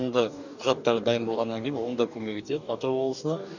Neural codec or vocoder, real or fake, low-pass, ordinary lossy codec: codec, 44.1 kHz, 2.6 kbps, DAC; fake; 7.2 kHz; none